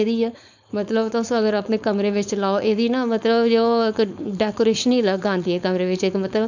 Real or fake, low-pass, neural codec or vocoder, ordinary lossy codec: fake; 7.2 kHz; codec, 16 kHz, 4.8 kbps, FACodec; none